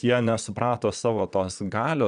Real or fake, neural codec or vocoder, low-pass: fake; vocoder, 22.05 kHz, 80 mel bands, Vocos; 9.9 kHz